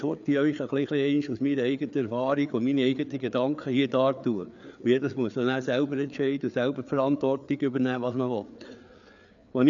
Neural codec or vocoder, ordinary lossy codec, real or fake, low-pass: codec, 16 kHz, 4 kbps, FreqCodec, larger model; none; fake; 7.2 kHz